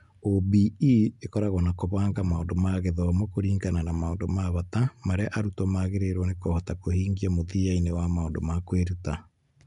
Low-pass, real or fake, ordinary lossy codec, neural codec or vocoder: 10.8 kHz; real; MP3, 64 kbps; none